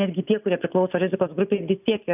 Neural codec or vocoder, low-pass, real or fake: none; 3.6 kHz; real